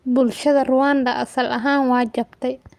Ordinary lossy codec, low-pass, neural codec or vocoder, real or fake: Opus, 32 kbps; 14.4 kHz; none; real